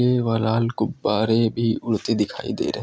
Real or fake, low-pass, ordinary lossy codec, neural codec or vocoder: real; none; none; none